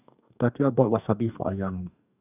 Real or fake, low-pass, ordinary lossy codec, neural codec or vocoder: fake; 3.6 kHz; none; codec, 44.1 kHz, 2.6 kbps, DAC